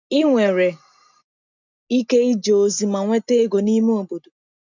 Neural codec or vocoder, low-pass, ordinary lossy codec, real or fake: none; 7.2 kHz; none; real